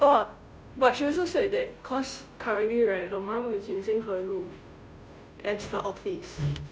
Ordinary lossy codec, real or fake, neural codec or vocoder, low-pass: none; fake; codec, 16 kHz, 0.5 kbps, FunCodec, trained on Chinese and English, 25 frames a second; none